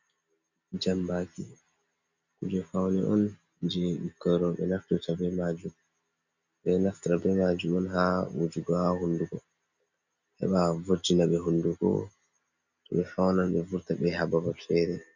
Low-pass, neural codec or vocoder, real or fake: 7.2 kHz; none; real